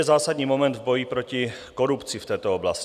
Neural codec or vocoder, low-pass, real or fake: none; 14.4 kHz; real